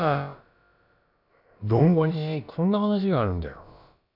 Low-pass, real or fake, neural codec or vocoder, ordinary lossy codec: 5.4 kHz; fake; codec, 16 kHz, about 1 kbps, DyCAST, with the encoder's durations; none